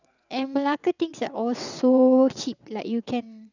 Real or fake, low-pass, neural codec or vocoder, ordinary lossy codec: fake; 7.2 kHz; vocoder, 22.05 kHz, 80 mel bands, WaveNeXt; none